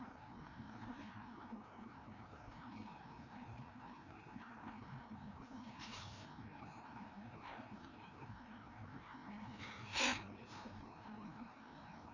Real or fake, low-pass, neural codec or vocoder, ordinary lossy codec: fake; 7.2 kHz; codec, 16 kHz, 1 kbps, FreqCodec, larger model; none